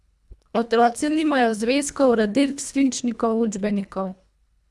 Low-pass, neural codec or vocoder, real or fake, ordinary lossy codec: none; codec, 24 kHz, 1.5 kbps, HILCodec; fake; none